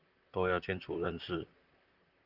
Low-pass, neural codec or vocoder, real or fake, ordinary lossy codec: 5.4 kHz; vocoder, 44.1 kHz, 128 mel bands, Pupu-Vocoder; fake; Opus, 24 kbps